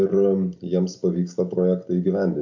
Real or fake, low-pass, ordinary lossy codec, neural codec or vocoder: real; 7.2 kHz; AAC, 48 kbps; none